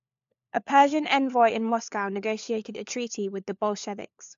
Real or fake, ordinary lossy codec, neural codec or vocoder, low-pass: fake; AAC, 64 kbps; codec, 16 kHz, 4 kbps, FunCodec, trained on LibriTTS, 50 frames a second; 7.2 kHz